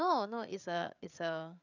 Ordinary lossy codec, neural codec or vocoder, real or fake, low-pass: none; codec, 16 kHz, 8 kbps, FreqCodec, larger model; fake; 7.2 kHz